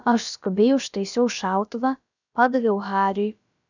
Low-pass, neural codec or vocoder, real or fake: 7.2 kHz; codec, 16 kHz, about 1 kbps, DyCAST, with the encoder's durations; fake